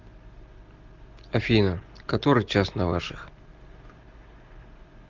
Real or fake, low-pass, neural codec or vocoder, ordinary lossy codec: real; 7.2 kHz; none; Opus, 16 kbps